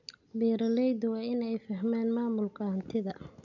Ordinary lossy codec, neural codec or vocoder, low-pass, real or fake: none; none; 7.2 kHz; real